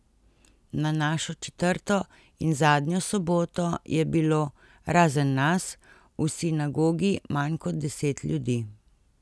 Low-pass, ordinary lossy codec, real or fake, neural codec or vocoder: none; none; real; none